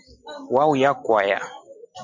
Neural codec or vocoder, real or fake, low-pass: none; real; 7.2 kHz